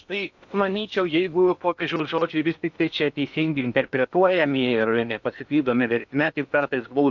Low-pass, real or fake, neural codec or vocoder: 7.2 kHz; fake; codec, 16 kHz in and 24 kHz out, 0.8 kbps, FocalCodec, streaming, 65536 codes